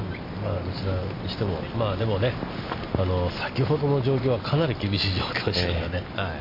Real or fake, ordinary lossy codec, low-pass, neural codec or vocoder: real; MP3, 32 kbps; 5.4 kHz; none